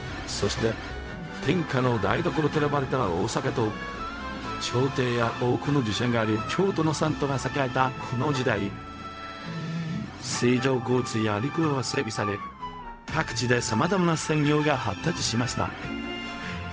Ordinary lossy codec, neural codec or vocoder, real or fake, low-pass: none; codec, 16 kHz, 0.4 kbps, LongCat-Audio-Codec; fake; none